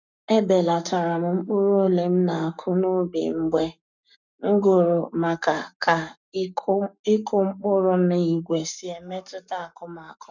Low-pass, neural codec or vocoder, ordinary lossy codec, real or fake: 7.2 kHz; codec, 44.1 kHz, 7.8 kbps, Pupu-Codec; none; fake